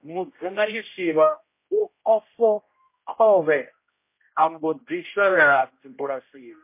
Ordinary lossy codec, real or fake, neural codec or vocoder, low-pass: MP3, 24 kbps; fake; codec, 16 kHz, 0.5 kbps, X-Codec, HuBERT features, trained on general audio; 3.6 kHz